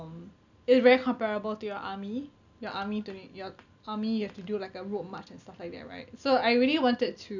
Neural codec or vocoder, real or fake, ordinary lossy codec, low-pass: none; real; none; 7.2 kHz